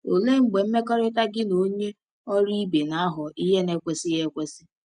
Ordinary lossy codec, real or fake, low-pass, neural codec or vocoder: none; real; 9.9 kHz; none